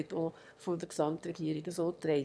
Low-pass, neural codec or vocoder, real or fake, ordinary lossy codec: none; autoencoder, 22.05 kHz, a latent of 192 numbers a frame, VITS, trained on one speaker; fake; none